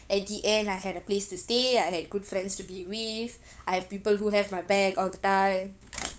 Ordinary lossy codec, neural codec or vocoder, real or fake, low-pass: none; codec, 16 kHz, 8 kbps, FunCodec, trained on LibriTTS, 25 frames a second; fake; none